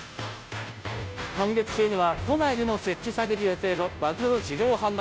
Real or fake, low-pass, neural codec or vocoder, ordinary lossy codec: fake; none; codec, 16 kHz, 0.5 kbps, FunCodec, trained on Chinese and English, 25 frames a second; none